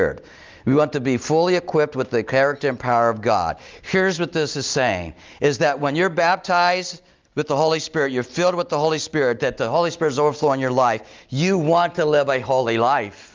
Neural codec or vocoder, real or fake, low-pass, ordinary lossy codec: none; real; 7.2 kHz; Opus, 24 kbps